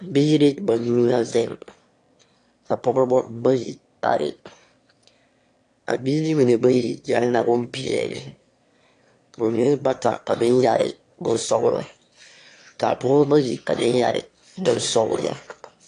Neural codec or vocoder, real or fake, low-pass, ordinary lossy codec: autoencoder, 22.05 kHz, a latent of 192 numbers a frame, VITS, trained on one speaker; fake; 9.9 kHz; AAC, 48 kbps